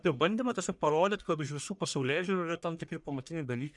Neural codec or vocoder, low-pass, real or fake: codec, 44.1 kHz, 1.7 kbps, Pupu-Codec; 10.8 kHz; fake